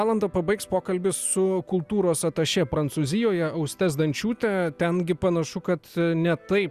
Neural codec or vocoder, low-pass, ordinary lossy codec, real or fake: none; 14.4 kHz; Opus, 64 kbps; real